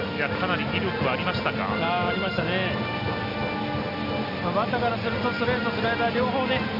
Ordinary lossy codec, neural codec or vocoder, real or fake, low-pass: Opus, 64 kbps; none; real; 5.4 kHz